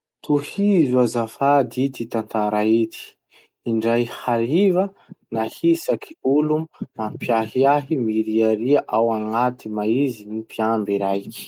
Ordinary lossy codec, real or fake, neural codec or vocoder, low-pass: Opus, 32 kbps; real; none; 19.8 kHz